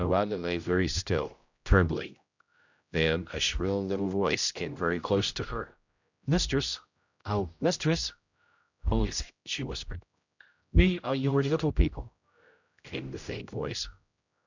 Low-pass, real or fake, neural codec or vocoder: 7.2 kHz; fake; codec, 16 kHz, 0.5 kbps, X-Codec, HuBERT features, trained on general audio